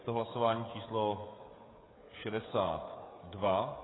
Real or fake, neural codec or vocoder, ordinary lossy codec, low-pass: fake; codec, 16 kHz, 16 kbps, FreqCodec, smaller model; AAC, 16 kbps; 7.2 kHz